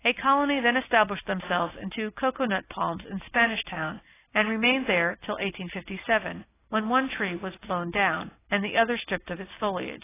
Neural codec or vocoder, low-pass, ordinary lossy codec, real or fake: none; 3.6 kHz; AAC, 16 kbps; real